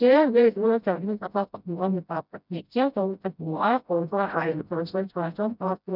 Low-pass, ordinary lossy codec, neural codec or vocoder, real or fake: 5.4 kHz; none; codec, 16 kHz, 0.5 kbps, FreqCodec, smaller model; fake